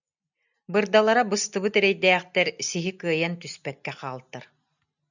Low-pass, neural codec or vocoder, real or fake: 7.2 kHz; none; real